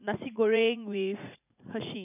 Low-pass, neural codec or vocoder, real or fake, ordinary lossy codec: 3.6 kHz; vocoder, 44.1 kHz, 80 mel bands, Vocos; fake; none